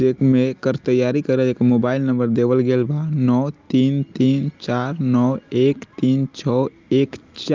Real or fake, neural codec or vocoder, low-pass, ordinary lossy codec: real; none; 7.2 kHz; Opus, 32 kbps